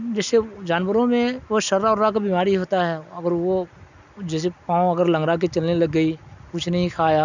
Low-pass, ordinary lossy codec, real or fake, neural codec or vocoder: 7.2 kHz; none; real; none